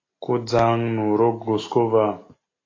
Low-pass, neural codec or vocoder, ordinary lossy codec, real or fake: 7.2 kHz; none; AAC, 32 kbps; real